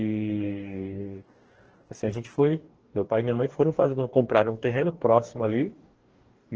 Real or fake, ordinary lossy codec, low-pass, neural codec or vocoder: fake; Opus, 16 kbps; 7.2 kHz; codec, 44.1 kHz, 2.6 kbps, DAC